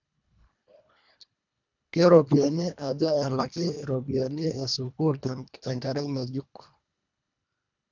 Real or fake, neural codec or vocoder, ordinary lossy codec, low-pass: fake; codec, 24 kHz, 1.5 kbps, HILCodec; none; 7.2 kHz